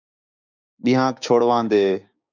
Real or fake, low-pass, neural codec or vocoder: fake; 7.2 kHz; codec, 16 kHz, 6 kbps, DAC